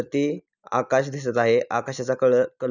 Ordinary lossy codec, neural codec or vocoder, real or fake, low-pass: none; none; real; 7.2 kHz